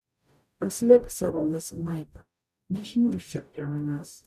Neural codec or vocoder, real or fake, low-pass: codec, 44.1 kHz, 0.9 kbps, DAC; fake; 14.4 kHz